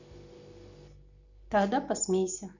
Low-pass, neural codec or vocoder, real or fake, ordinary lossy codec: 7.2 kHz; none; real; none